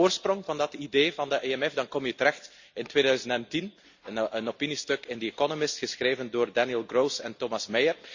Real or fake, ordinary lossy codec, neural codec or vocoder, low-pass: real; Opus, 64 kbps; none; 7.2 kHz